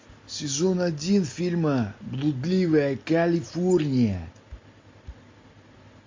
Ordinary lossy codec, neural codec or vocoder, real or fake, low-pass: MP3, 48 kbps; none; real; 7.2 kHz